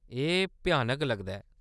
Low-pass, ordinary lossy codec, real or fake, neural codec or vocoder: none; none; real; none